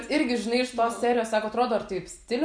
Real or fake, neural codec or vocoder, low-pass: real; none; 9.9 kHz